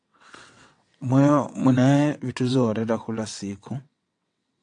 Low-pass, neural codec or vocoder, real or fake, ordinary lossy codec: 9.9 kHz; vocoder, 22.05 kHz, 80 mel bands, WaveNeXt; fake; AAC, 64 kbps